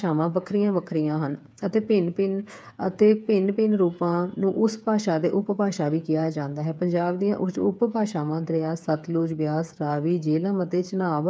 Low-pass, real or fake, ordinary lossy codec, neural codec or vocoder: none; fake; none; codec, 16 kHz, 8 kbps, FreqCodec, smaller model